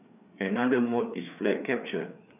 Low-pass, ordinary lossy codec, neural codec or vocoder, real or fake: 3.6 kHz; none; codec, 16 kHz, 4 kbps, FreqCodec, larger model; fake